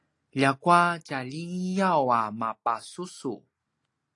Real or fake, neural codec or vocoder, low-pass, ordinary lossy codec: real; none; 10.8 kHz; AAC, 48 kbps